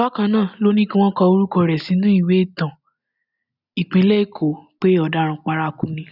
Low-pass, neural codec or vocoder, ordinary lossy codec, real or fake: 5.4 kHz; none; none; real